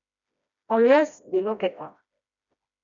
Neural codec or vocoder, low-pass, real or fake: codec, 16 kHz, 1 kbps, FreqCodec, smaller model; 7.2 kHz; fake